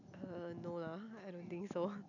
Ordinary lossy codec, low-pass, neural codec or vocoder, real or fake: none; 7.2 kHz; none; real